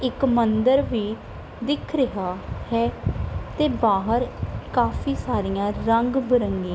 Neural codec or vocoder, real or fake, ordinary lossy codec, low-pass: none; real; none; none